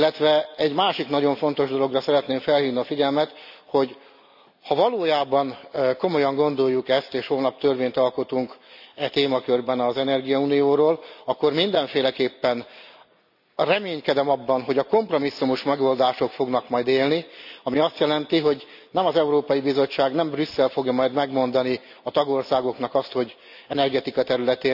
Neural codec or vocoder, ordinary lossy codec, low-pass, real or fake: none; none; 5.4 kHz; real